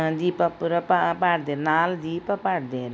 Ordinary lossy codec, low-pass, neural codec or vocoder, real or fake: none; none; none; real